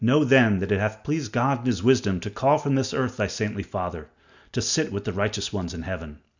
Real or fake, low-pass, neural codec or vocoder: real; 7.2 kHz; none